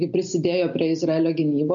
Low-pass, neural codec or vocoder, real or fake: 7.2 kHz; none; real